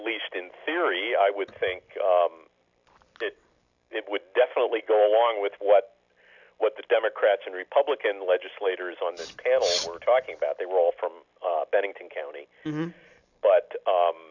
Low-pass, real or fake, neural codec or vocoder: 7.2 kHz; real; none